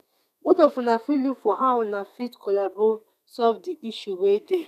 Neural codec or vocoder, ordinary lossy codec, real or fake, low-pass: codec, 32 kHz, 1.9 kbps, SNAC; none; fake; 14.4 kHz